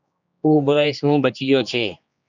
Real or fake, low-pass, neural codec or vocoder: fake; 7.2 kHz; codec, 16 kHz, 2 kbps, X-Codec, HuBERT features, trained on general audio